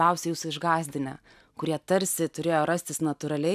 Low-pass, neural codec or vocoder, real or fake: 14.4 kHz; none; real